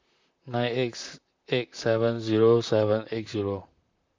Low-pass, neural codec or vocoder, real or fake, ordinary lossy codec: 7.2 kHz; vocoder, 44.1 kHz, 128 mel bands, Pupu-Vocoder; fake; MP3, 64 kbps